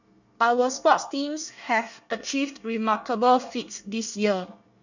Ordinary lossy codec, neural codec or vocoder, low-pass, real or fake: none; codec, 24 kHz, 1 kbps, SNAC; 7.2 kHz; fake